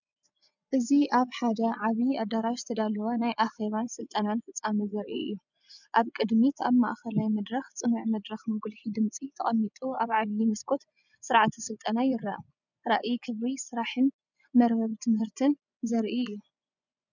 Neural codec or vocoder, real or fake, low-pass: vocoder, 24 kHz, 100 mel bands, Vocos; fake; 7.2 kHz